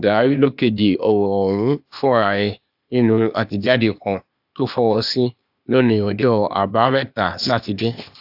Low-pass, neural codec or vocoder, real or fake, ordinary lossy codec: 5.4 kHz; codec, 16 kHz, 0.8 kbps, ZipCodec; fake; AAC, 48 kbps